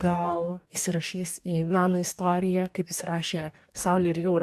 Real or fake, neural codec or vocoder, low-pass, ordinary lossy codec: fake; codec, 44.1 kHz, 2.6 kbps, DAC; 14.4 kHz; AAC, 96 kbps